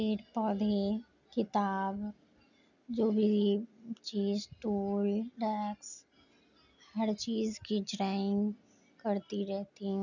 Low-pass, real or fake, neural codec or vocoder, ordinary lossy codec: 7.2 kHz; real; none; none